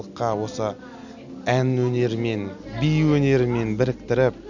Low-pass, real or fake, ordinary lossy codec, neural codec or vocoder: 7.2 kHz; real; none; none